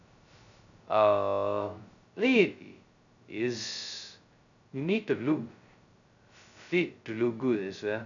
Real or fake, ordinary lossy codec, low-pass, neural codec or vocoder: fake; none; 7.2 kHz; codec, 16 kHz, 0.2 kbps, FocalCodec